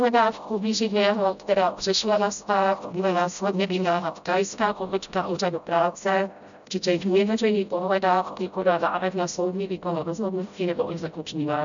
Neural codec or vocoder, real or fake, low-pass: codec, 16 kHz, 0.5 kbps, FreqCodec, smaller model; fake; 7.2 kHz